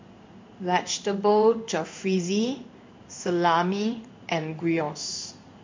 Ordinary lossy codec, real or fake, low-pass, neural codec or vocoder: MP3, 48 kbps; fake; 7.2 kHz; codec, 16 kHz in and 24 kHz out, 1 kbps, XY-Tokenizer